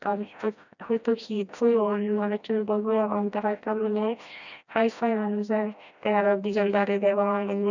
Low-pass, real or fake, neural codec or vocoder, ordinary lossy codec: 7.2 kHz; fake; codec, 16 kHz, 1 kbps, FreqCodec, smaller model; none